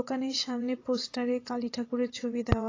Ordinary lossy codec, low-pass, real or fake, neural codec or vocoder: AAC, 32 kbps; 7.2 kHz; fake; vocoder, 22.05 kHz, 80 mel bands, WaveNeXt